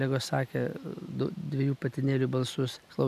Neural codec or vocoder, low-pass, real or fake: none; 14.4 kHz; real